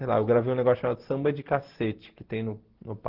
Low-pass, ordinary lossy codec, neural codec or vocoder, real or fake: 5.4 kHz; Opus, 16 kbps; none; real